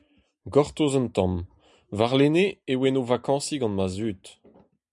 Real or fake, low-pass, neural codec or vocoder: real; 10.8 kHz; none